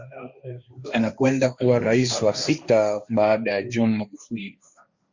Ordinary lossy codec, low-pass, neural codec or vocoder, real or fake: Opus, 64 kbps; 7.2 kHz; codec, 16 kHz, 1.1 kbps, Voila-Tokenizer; fake